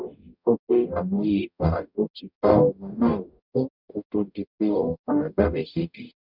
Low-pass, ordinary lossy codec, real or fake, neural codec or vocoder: 5.4 kHz; none; fake; codec, 44.1 kHz, 0.9 kbps, DAC